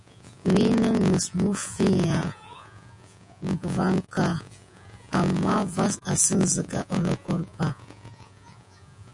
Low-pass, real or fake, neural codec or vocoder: 10.8 kHz; fake; vocoder, 48 kHz, 128 mel bands, Vocos